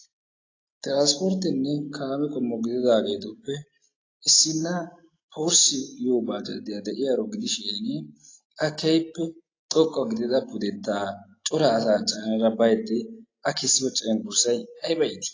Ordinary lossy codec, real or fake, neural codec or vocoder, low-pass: AAC, 32 kbps; real; none; 7.2 kHz